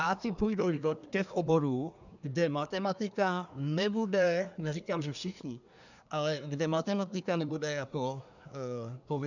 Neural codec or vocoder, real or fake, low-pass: codec, 24 kHz, 1 kbps, SNAC; fake; 7.2 kHz